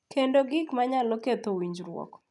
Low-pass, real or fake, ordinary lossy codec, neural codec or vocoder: 10.8 kHz; real; none; none